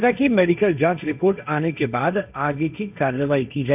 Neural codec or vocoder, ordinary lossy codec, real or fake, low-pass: codec, 16 kHz, 1.1 kbps, Voila-Tokenizer; none; fake; 3.6 kHz